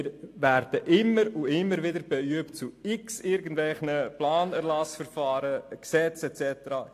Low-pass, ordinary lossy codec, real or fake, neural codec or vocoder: 14.4 kHz; AAC, 64 kbps; real; none